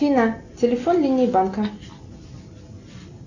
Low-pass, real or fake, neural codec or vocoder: 7.2 kHz; real; none